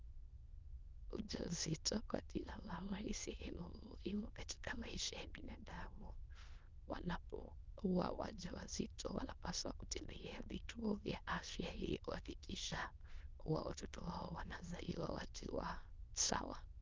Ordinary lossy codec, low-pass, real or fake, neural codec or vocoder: Opus, 24 kbps; 7.2 kHz; fake; autoencoder, 22.05 kHz, a latent of 192 numbers a frame, VITS, trained on many speakers